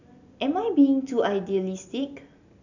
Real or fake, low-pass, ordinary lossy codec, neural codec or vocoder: real; 7.2 kHz; none; none